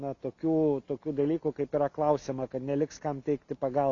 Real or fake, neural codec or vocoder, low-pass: real; none; 7.2 kHz